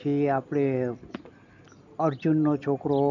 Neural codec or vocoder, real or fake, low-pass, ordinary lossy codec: none; real; 7.2 kHz; none